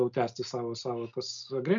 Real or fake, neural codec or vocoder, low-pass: real; none; 7.2 kHz